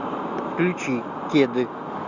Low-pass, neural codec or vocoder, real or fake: 7.2 kHz; none; real